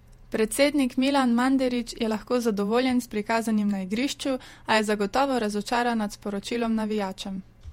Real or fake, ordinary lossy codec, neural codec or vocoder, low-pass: fake; MP3, 64 kbps; vocoder, 48 kHz, 128 mel bands, Vocos; 19.8 kHz